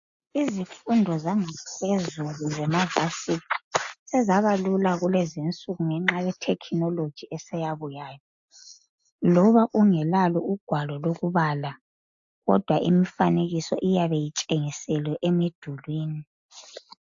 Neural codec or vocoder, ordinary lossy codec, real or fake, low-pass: none; AAC, 64 kbps; real; 7.2 kHz